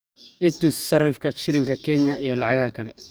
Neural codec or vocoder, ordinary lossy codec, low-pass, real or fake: codec, 44.1 kHz, 2.6 kbps, DAC; none; none; fake